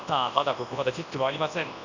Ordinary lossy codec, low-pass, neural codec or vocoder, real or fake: none; 7.2 kHz; codec, 24 kHz, 0.9 kbps, WavTokenizer, large speech release; fake